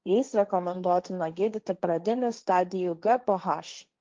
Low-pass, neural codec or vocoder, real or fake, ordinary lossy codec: 7.2 kHz; codec, 16 kHz, 1.1 kbps, Voila-Tokenizer; fake; Opus, 24 kbps